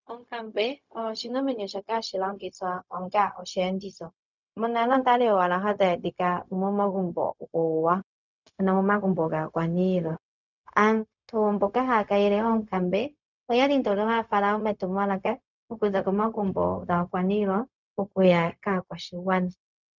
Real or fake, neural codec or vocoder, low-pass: fake; codec, 16 kHz, 0.4 kbps, LongCat-Audio-Codec; 7.2 kHz